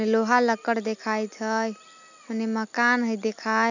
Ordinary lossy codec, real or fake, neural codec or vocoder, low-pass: none; real; none; 7.2 kHz